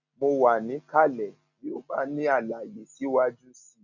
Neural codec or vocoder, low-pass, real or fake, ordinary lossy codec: none; 7.2 kHz; real; none